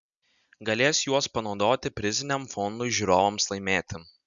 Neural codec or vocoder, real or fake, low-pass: none; real; 7.2 kHz